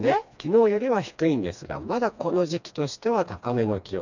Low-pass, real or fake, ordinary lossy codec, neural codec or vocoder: 7.2 kHz; fake; none; codec, 16 kHz, 2 kbps, FreqCodec, smaller model